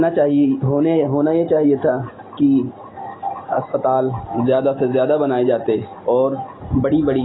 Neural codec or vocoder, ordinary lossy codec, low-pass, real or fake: none; AAC, 16 kbps; 7.2 kHz; real